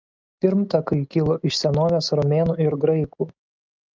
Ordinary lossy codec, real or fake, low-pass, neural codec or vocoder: Opus, 24 kbps; real; 7.2 kHz; none